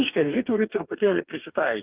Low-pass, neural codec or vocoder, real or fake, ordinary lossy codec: 3.6 kHz; codec, 44.1 kHz, 2.6 kbps, DAC; fake; Opus, 24 kbps